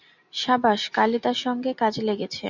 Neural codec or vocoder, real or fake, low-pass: none; real; 7.2 kHz